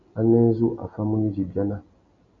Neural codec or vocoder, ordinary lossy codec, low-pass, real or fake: none; MP3, 48 kbps; 7.2 kHz; real